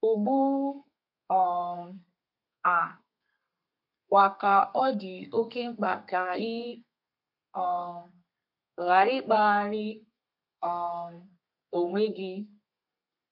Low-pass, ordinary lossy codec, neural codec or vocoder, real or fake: 5.4 kHz; none; codec, 32 kHz, 1.9 kbps, SNAC; fake